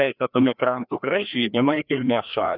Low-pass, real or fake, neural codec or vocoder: 5.4 kHz; fake; codec, 16 kHz, 1 kbps, FreqCodec, larger model